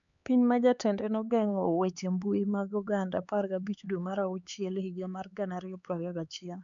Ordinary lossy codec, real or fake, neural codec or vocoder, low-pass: none; fake; codec, 16 kHz, 4 kbps, X-Codec, HuBERT features, trained on LibriSpeech; 7.2 kHz